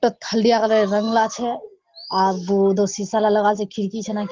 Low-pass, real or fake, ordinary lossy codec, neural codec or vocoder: 7.2 kHz; real; Opus, 16 kbps; none